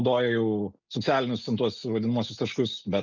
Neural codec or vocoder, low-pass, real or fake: none; 7.2 kHz; real